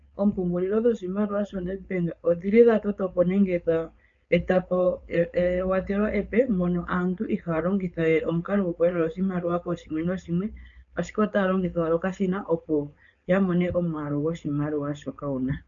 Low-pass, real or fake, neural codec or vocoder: 7.2 kHz; fake; codec, 16 kHz, 4.8 kbps, FACodec